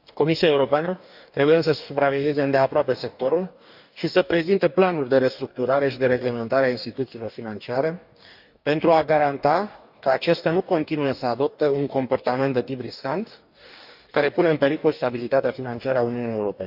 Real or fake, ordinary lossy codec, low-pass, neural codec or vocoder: fake; none; 5.4 kHz; codec, 44.1 kHz, 2.6 kbps, DAC